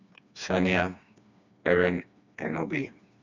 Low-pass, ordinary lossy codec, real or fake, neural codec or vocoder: 7.2 kHz; none; fake; codec, 16 kHz, 2 kbps, FreqCodec, smaller model